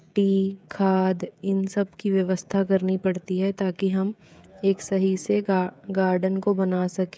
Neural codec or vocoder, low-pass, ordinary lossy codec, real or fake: codec, 16 kHz, 16 kbps, FreqCodec, smaller model; none; none; fake